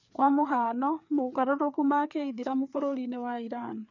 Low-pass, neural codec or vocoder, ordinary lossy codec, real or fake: 7.2 kHz; codec, 16 kHz, 4 kbps, FreqCodec, larger model; none; fake